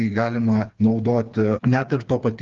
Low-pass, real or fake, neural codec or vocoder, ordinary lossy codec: 7.2 kHz; fake; codec, 16 kHz, 4 kbps, FreqCodec, smaller model; Opus, 32 kbps